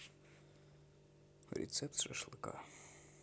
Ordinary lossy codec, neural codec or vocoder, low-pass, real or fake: none; none; none; real